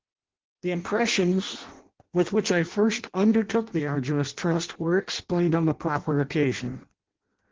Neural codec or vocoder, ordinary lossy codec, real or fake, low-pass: codec, 16 kHz in and 24 kHz out, 0.6 kbps, FireRedTTS-2 codec; Opus, 16 kbps; fake; 7.2 kHz